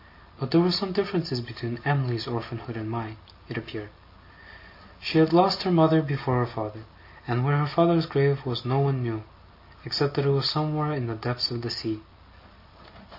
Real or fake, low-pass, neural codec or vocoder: real; 5.4 kHz; none